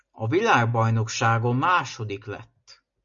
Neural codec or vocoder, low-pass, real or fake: none; 7.2 kHz; real